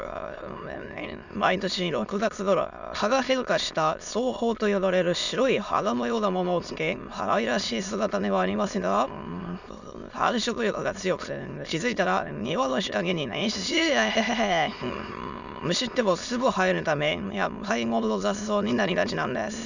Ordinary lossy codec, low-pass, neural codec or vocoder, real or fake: none; 7.2 kHz; autoencoder, 22.05 kHz, a latent of 192 numbers a frame, VITS, trained on many speakers; fake